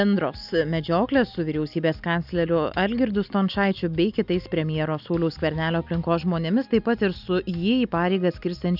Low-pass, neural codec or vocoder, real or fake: 5.4 kHz; none; real